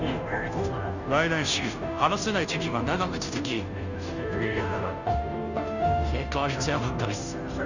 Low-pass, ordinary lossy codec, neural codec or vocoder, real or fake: 7.2 kHz; none; codec, 16 kHz, 0.5 kbps, FunCodec, trained on Chinese and English, 25 frames a second; fake